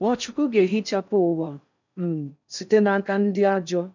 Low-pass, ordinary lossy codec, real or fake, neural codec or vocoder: 7.2 kHz; none; fake; codec, 16 kHz in and 24 kHz out, 0.6 kbps, FocalCodec, streaming, 4096 codes